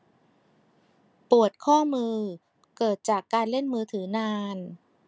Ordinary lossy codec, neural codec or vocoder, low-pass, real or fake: none; none; none; real